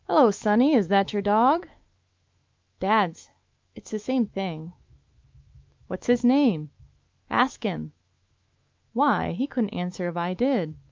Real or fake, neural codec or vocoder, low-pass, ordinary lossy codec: fake; autoencoder, 48 kHz, 128 numbers a frame, DAC-VAE, trained on Japanese speech; 7.2 kHz; Opus, 24 kbps